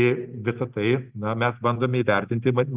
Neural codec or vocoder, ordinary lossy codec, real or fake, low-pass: none; Opus, 32 kbps; real; 3.6 kHz